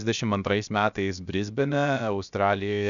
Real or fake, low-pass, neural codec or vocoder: fake; 7.2 kHz; codec, 16 kHz, 0.7 kbps, FocalCodec